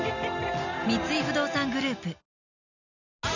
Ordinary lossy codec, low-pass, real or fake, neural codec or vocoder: none; 7.2 kHz; real; none